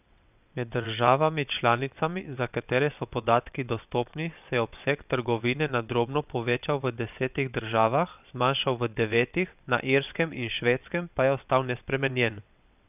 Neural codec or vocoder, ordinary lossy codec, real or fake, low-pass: vocoder, 22.05 kHz, 80 mel bands, WaveNeXt; none; fake; 3.6 kHz